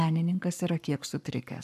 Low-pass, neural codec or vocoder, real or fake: 14.4 kHz; codec, 44.1 kHz, 7.8 kbps, Pupu-Codec; fake